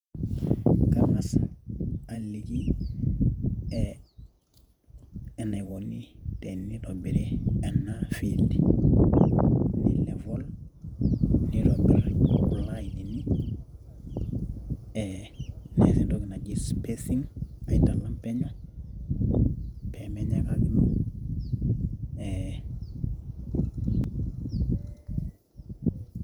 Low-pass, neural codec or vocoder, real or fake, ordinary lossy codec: 19.8 kHz; vocoder, 48 kHz, 128 mel bands, Vocos; fake; none